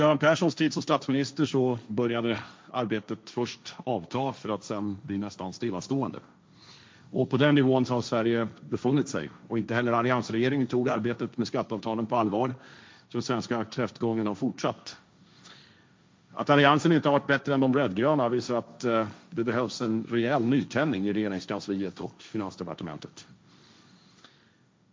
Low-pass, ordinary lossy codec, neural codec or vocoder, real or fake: none; none; codec, 16 kHz, 1.1 kbps, Voila-Tokenizer; fake